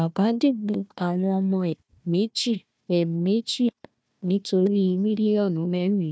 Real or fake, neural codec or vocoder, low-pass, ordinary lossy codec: fake; codec, 16 kHz, 1 kbps, FunCodec, trained on Chinese and English, 50 frames a second; none; none